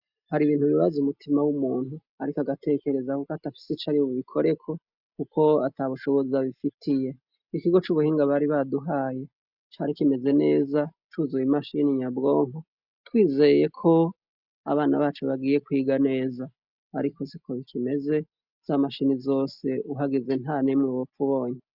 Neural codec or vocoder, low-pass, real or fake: none; 5.4 kHz; real